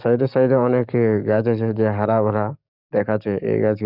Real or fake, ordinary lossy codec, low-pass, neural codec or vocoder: fake; none; 5.4 kHz; codec, 44.1 kHz, 7.8 kbps, DAC